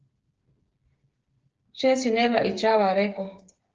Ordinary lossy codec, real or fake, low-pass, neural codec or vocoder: Opus, 16 kbps; fake; 7.2 kHz; codec, 16 kHz, 8 kbps, FreqCodec, smaller model